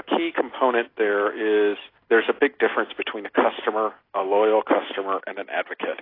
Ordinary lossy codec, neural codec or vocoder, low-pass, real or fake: AAC, 24 kbps; none; 5.4 kHz; real